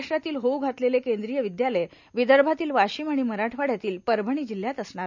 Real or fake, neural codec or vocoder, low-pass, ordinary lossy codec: real; none; 7.2 kHz; none